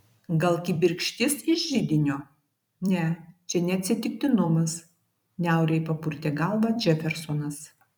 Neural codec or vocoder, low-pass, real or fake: vocoder, 44.1 kHz, 128 mel bands every 256 samples, BigVGAN v2; 19.8 kHz; fake